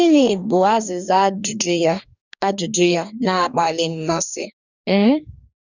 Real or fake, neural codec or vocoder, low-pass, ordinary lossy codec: fake; codec, 44.1 kHz, 2.6 kbps, DAC; 7.2 kHz; none